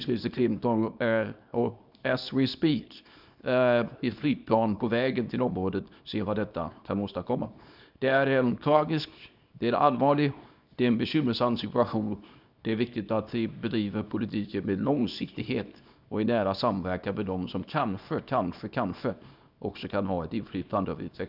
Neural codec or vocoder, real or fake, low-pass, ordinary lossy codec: codec, 24 kHz, 0.9 kbps, WavTokenizer, small release; fake; 5.4 kHz; none